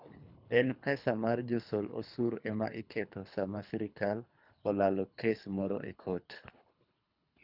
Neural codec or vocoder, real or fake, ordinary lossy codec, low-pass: codec, 24 kHz, 3 kbps, HILCodec; fake; none; 5.4 kHz